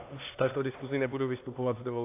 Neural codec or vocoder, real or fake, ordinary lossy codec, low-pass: codec, 16 kHz, 2 kbps, X-Codec, HuBERT features, trained on LibriSpeech; fake; AAC, 24 kbps; 3.6 kHz